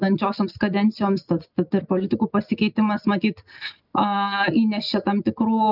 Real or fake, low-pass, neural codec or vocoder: real; 5.4 kHz; none